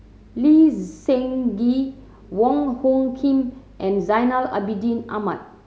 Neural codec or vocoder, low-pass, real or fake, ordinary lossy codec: none; none; real; none